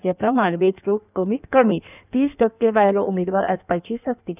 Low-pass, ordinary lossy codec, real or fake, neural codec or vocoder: 3.6 kHz; none; fake; codec, 16 kHz in and 24 kHz out, 1.1 kbps, FireRedTTS-2 codec